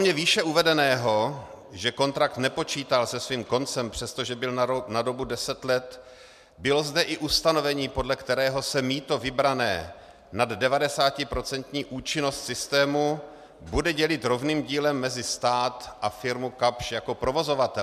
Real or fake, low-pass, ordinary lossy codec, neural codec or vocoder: real; 14.4 kHz; MP3, 96 kbps; none